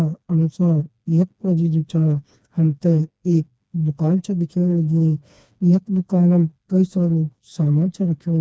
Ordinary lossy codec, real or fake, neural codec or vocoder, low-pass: none; fake; codec, 16 kHz, 2 kbps, FreqCodec, smaller model; none